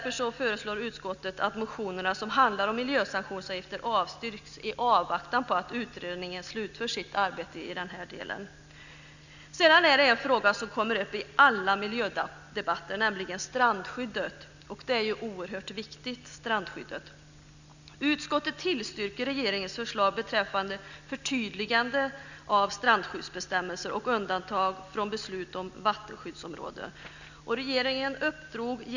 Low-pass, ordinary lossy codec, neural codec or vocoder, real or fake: 7.2 kHz; none; none; real